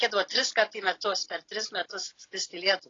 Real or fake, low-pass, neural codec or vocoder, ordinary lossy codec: real; 7.2 kHz; none; AAC, 32 kbps